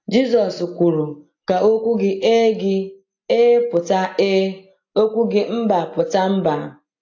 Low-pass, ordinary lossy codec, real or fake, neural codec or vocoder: 7.2 kHz; AAC, 48 kbps; real; none